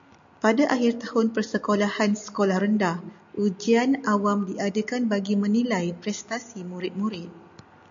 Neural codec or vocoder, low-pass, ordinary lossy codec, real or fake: none; 7.2 kHz; MP3, 64 kbps; real